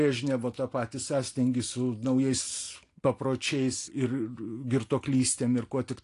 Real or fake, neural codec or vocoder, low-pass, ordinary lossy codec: real; none; 10.8 kHz; AAC, 48 kbps